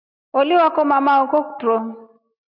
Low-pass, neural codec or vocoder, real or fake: 5.4 kHz; none; real